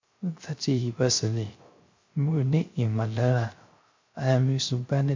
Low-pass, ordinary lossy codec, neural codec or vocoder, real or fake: 7.2 kHz; MP3, 48 kbps; codec, 16 kHz, 0.3 kbps, FocalCodec; fake